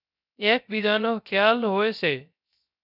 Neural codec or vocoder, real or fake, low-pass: codec, 16 kHz, 0.3 kbps, FocalCodec; fake; 5.4 kHz